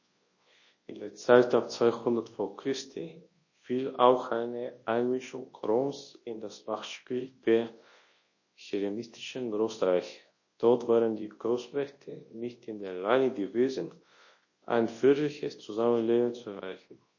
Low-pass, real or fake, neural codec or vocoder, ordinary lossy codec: 7.2 kHz; fake; codec, 24 kHz, 0.9 kbps, WavTokenizer, large speech release; MP3, 32 kbps